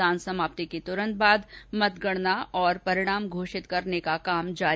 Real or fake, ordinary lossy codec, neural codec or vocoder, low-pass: real; none; none; none